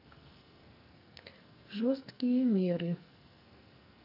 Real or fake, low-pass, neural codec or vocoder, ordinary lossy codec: fake; 5.4 kHz; codec, 44.1 kHz, 2.6 kbps, SNAC; none